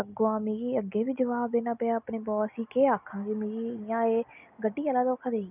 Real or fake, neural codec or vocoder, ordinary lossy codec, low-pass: real; none; none; 3.6 kHz